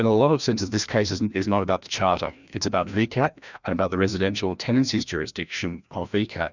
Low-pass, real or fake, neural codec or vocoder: 7.2 kHz; fake; codec, 16 kHz, 1 kbps, FreqCodec, larger model